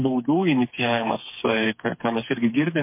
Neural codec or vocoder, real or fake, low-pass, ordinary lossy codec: codec, 16 kHz, 4 kbps, FreqCodec, smaller model; fake; 3.6 kHz; MP3, 24 kbps